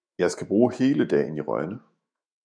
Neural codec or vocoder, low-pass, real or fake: autoencoder, 48 kHz, 128 numbers a frame, DAC-VAE, trained on Japanese speech; 9.9 kHz; fake